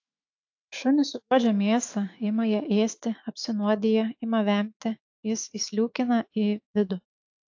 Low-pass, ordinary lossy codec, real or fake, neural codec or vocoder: 7.2 kHz; AAC, 48 kbps; fake; autoencoder, 48 kHz, 128 numbers a frame, DAC-VAE, trained on Japanese speech